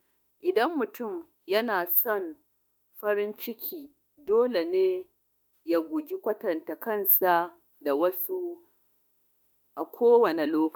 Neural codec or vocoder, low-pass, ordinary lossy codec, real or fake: autoencoder, 48 kHz, 32 numbers a frame, DAC-VAE, trained on Japanese speech; none; none; fake